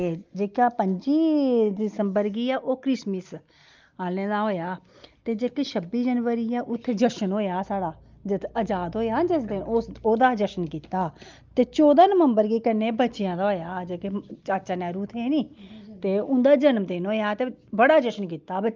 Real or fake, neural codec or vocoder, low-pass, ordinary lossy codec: real; none; 7.2 kHz; Opus, 24 kbps